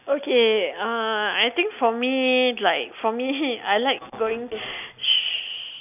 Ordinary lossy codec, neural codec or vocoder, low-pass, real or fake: none; none; 3.6 kHz; real